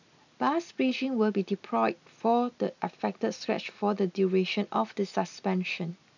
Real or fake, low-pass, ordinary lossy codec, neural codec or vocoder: real; 7.2 kHz; none; none